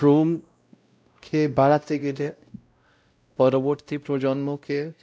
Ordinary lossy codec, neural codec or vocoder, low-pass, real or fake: none; codec, 16 kHz, 0.5 kbps, X-Codec, WavLM features, trained on Multilingual LibriSpeech; none; fake